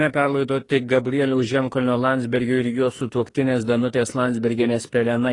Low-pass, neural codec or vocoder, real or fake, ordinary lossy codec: 10.8 kHz; codec, 44.1 kHz, 2.6 kbps, SNAC; fake; AAC, 32 kbps